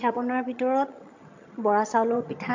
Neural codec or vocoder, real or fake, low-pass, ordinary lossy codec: vocoder, 22.05 kHz, 80 mel bands, HiFi-GAN; fake; 7.2 kHz; MP3, 48 kbps